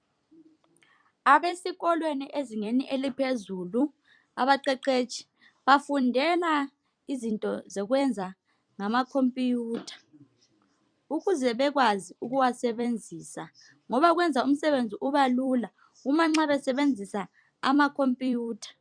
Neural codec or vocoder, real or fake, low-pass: vocoder, 44.1 kHz, 128 mel bands every 256 samples, BigVGAN v2; fake; 9.9 kHz